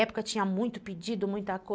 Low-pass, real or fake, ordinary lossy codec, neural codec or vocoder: none; real; none; none